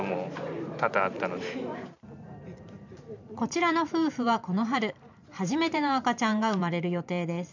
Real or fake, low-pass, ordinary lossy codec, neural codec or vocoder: fake; 7.2 kHz; none; vocoder, 44.1 kHz, 128 mel bands every 512 samples, BigVGAN v2